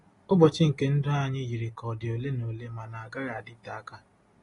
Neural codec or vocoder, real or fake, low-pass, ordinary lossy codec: none; real; 10.8 kHz; AAC, 32 kbps